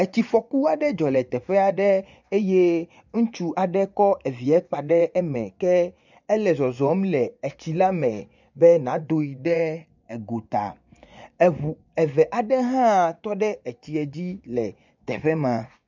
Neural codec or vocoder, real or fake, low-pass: vocoder, 24 kHz, 100 mel bands, Vocos; fake; 7.2 kHz